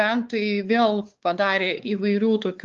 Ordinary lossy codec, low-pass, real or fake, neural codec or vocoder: Opus, 32 kbps; 7.2 kHz; fake; codec, 16 kHz, 4 kbps, X-Codec, WavLM features, trained on Multilingual LibriSpeech